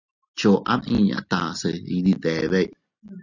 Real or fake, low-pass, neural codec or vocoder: real; 7.2 kHz; none